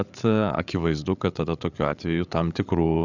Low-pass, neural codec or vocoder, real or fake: 7.2 kHz; codec, 16 kHz, 8 kbps, FreqCodec, larger model; fake